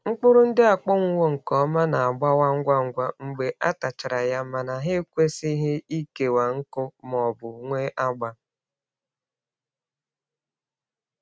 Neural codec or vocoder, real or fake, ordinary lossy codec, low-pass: none; real; none; none